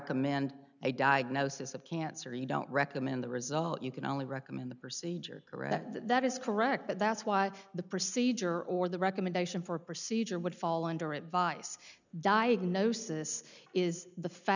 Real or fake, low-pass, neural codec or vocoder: real; 7.2 kHz; none